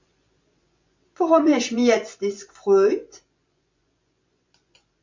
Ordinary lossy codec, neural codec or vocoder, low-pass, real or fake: MP3, 48 kbps; vocoder, 24 kHz, 100 mel bands, Vocos; 7.2 kHz; fake